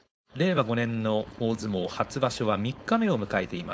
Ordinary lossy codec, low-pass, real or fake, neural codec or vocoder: none; none; fake; codec, 16 kHz, 4.8 kbps, FACodec